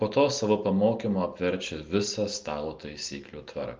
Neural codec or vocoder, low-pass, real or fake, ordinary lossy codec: none; 7.2 kHz; real; Opus, 32 kbps